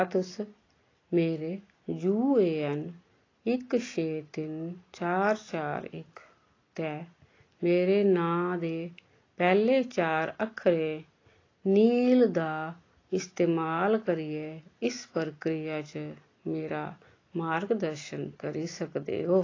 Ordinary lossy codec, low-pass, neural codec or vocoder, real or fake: AAC, 32 kbps; 7.2 kHz; none; real